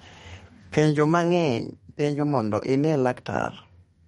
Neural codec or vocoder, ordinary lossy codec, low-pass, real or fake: codec, 32 kHz, 1.9 kbps, SNAC; MP3, 48 kbps; 14.4 kHz; fake